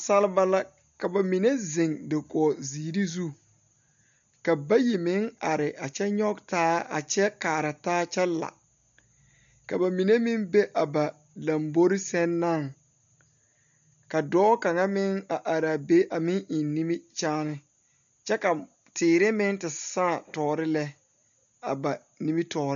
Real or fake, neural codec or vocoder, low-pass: real; none; 7.2 kHz